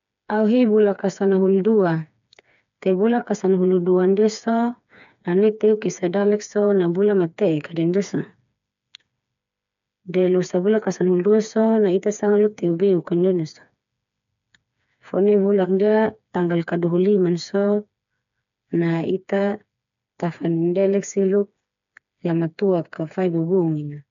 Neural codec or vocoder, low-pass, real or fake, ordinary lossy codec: codec, 16 kHz, 4 kbps, FreqCodec, smaller model; 7.2 kHz; fake; none